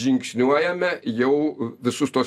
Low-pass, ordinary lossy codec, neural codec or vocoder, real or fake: 14.4 kHz; AAC, 96 kbps; none; real